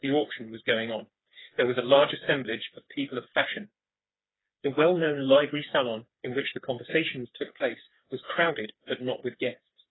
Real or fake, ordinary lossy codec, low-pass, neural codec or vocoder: fake; AAC, 16 kbps; 7.2 kHz; codec, 16 kHz, 4 kbps, FreqCodec, smaller model